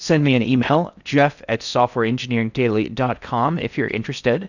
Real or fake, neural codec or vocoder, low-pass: fake; codec, 16 kHz in and 24 kHz out, 0.6 kbps, FocalCodec, streaming, 4096 codes; 7.2 kHz